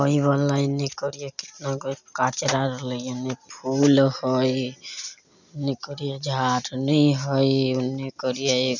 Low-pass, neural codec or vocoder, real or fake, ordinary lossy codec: 7.2 kHz; none; real; none